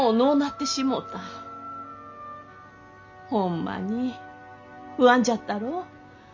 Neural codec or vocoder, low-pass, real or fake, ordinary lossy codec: none; 7.2 kHz; real; none